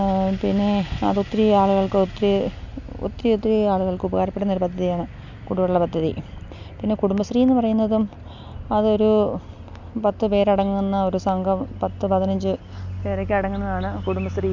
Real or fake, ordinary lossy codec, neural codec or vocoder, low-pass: real; none; none; 7.2 kHz